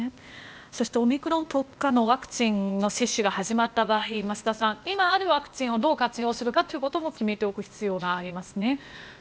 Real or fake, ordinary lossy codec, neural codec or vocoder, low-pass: fake; none; codec, 16 kHz, 0.8 kbps, ZipCodec; none